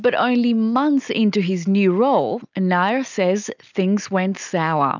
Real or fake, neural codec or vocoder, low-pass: real; none; 7.2 kHz